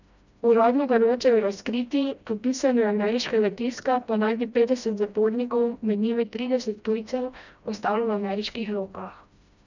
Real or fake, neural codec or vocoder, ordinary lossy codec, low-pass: fake; codec, 16 kHz, 1 kbps, FreqCodec, smaller model; none; 7.2 kHz